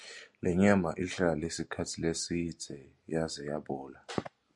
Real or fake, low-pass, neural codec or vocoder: real; 10.8 kHz; none